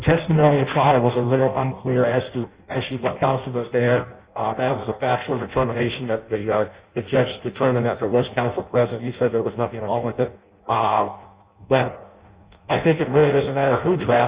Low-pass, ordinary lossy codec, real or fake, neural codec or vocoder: 3.6 kHz; Opus, 64 kbps; fake; codec, 16 kHz in and 24 kHz out, 0.6 kbps, FireRedTTS-2 codec